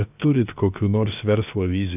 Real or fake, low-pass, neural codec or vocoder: fake; 3.6 kHz; codec, 16 kHz, 0.7 kbps, FocalCodec